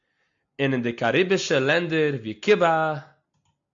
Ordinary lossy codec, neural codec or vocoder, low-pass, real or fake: AAC, 64 kbps; none; 7.2 kHz; real